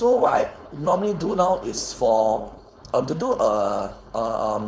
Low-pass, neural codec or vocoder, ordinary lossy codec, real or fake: none; codec, 16 kHz, 4.8 kbps, FACodec; none; fake